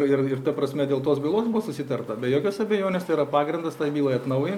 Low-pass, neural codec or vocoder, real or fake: 19.8 kHz; none; real